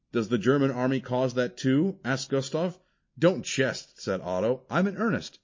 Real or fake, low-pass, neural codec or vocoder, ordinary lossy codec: real; 7.2 kHz; none; MP3, 32 kbps